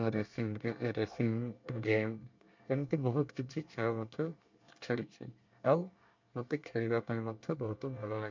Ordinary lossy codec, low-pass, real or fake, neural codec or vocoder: MP3, 64 kbps; 7.2 kHz; fake; codec, 24 kHz, 1 kbps, SNAC